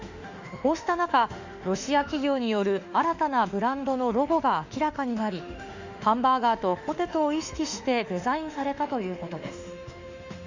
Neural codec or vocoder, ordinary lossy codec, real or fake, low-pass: autoencoder, 48 kHz, 32 numbers a frame, DAC-VAE, trained on Japanese speech; Opus, 64 kbps; fake; 7.2 kHz